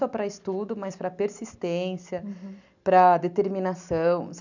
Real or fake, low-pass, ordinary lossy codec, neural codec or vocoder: real; 7.2 kHz; none; none